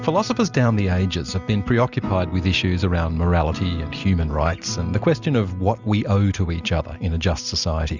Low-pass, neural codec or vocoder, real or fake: 7.2 kHz; none; real